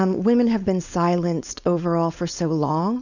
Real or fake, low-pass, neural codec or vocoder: fake; 7.2 kHz; codec, 16 kHz, 4.8 kbps, FACodec